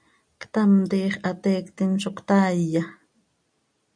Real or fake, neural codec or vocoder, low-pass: real; none; 9.9 kHz